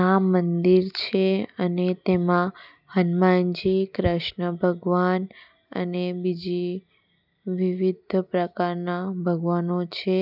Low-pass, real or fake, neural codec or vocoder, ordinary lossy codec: 5.4 kHz; real; none; none